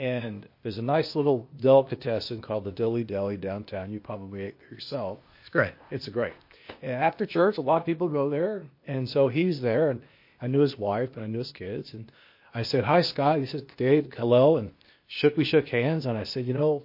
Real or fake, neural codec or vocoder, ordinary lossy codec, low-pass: fake; codec, 16 kHz, 0.8 kbps, ZipCodec; MP3, 32 kbps; 5.4 kHz